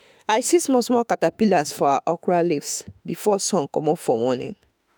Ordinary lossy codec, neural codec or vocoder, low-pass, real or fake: none; autoencoder, 48 kHz, 32 numbers a frame, DAC-VAE, trained on Japanese speech; none; fake